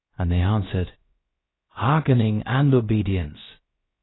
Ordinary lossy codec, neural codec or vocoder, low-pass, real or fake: AAC, 16 kbps; codec, 16 kHz, about 1 kbps, DyCAST, with the encoder's durations; 7.2 kHz; fake